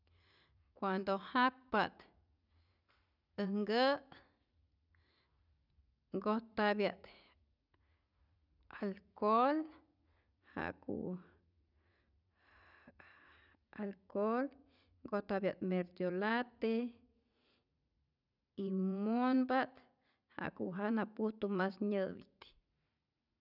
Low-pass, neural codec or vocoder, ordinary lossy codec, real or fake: 5.4 kHz; vocoder, 44.1 kHz, 80 mel bands, Vocos; none; fake